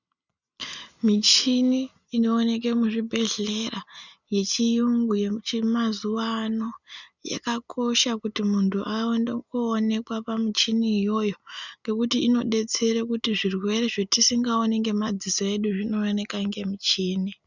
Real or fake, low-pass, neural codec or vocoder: real; 7.2 kHz; none